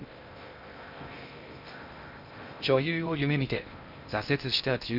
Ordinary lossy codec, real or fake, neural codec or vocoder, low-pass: none; fake; codec, 16 kHz in and 24 kHz out, 0.6 kbps, FocalCodec, streaming, 2048 codes; 5.4 kHz